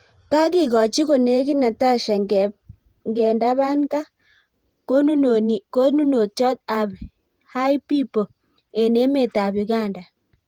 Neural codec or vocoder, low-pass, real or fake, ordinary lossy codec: vocoder, 48 kHz, 128 mel bands, Vocos; 19.8 kHz; fake; Opus, 24 kbps